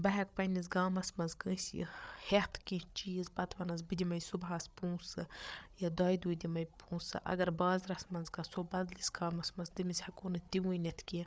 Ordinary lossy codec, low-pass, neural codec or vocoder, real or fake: none; none; codec, 16 kHz, 8 kbps, FreqCodec, larger model; fake